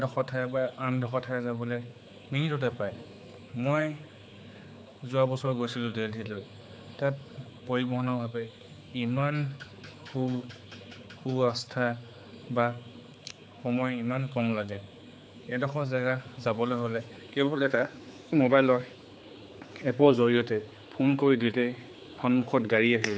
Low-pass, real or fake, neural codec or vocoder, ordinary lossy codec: none; fake; codec, 16 kHz, 4 kbps, X-Codec, HuBERT features, trained on general audio; none